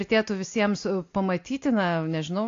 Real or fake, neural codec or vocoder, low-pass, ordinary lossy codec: real; none; 7.2 kHz; AAC, 48 kbps